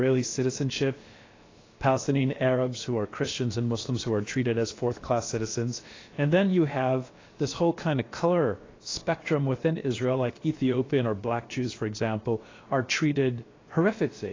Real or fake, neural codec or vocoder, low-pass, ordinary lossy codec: fake; codec, 16 kHz, about 1 kbps, DyCAST, with the encoder's durations; 7.2 kHz; AAC, 32 kbps